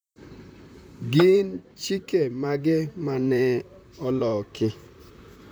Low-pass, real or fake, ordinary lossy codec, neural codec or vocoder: none; fake; none; vocoder, 44.1 kHz, 128 mel bands, Pupu-Vocoder